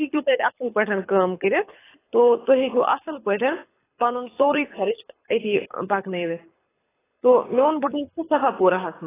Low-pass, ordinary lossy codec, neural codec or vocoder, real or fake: 3.6 kHz; AAC, 16 kbps; codec, 24 kHz, 6 kbps, HILCodec; fake